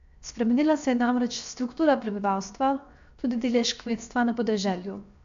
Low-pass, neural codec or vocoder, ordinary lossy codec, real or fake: 7.2 kHz; codec, 16 kHz, 0.7 kbps, FocalCodec; AAC, 64 kbps; fake